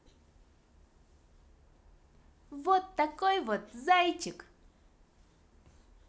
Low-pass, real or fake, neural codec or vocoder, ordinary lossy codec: none; real; none; none